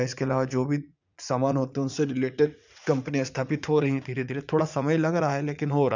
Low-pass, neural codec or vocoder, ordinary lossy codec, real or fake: 7.2 kHz; vocoder, 44.1 kHz, 80 mel bands, Vocos; none; fake